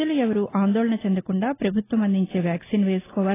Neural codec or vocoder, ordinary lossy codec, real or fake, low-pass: none; AAC, 16 kbps; real; 3.6 kHz